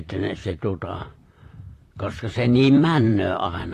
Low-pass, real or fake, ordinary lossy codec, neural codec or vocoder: 14.4 kHz; fake; AAC, 48 kbps; vocoder, 44.1 kHz, 128 mel bands, Pupu-Vocoder